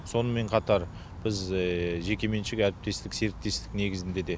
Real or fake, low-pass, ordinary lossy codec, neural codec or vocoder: real; none; none; none